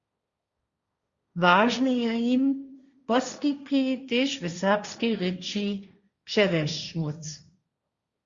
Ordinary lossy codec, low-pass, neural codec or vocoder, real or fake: Opus, 64 kbps; 7.2 kHz; codec, 16 kHz, 1.1 kbps, Voila-Tokenizer; fake